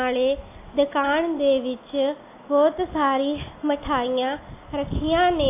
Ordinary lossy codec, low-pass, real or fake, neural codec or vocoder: AAC, 24 kbps; 3.6 kHz; real; none